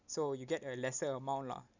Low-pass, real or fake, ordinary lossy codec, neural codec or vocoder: 7.2 kHz; real; none; none